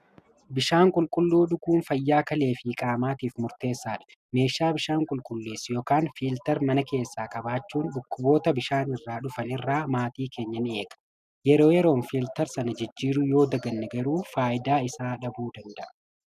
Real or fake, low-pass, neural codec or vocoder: real; 14.4 kHz; none